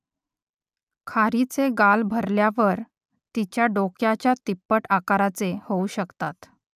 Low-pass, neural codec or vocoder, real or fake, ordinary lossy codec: 14.4 kHz; none; real; none